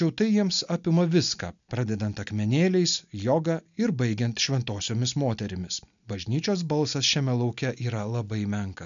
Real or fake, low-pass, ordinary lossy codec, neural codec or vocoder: real; 7.2 kHz; MP3, 96 kbps; none